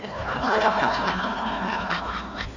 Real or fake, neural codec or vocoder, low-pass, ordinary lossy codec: fake; codec, 16 kHz, 1 kbps, FunCodec, trained on Chinese and English, 50 frames a second; 7.2 kHz; none